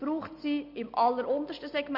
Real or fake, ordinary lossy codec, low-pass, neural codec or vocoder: real; MP3, 48 kbps; 5.4 kHz; none